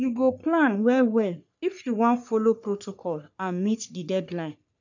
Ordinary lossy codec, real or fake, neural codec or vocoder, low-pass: none; fake; codec, 44.1 kHz, 3.4 kbps, Pupu-Codec; 7.2 kHz